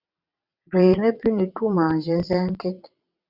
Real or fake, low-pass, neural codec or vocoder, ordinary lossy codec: fake; 5.4 kHz; vocoder, 22.05 kHz, 80 mel bands, WaveNeXt; Opus, 64 kbps